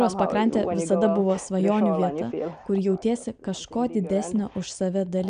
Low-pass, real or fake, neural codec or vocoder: 9.9 kHz; real; none